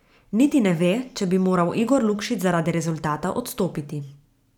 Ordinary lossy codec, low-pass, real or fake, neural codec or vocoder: none; 19.8 kHz; fake; vocoder, 44.1 kHz, 128 mel bands every 512 samples, BigVGAN v2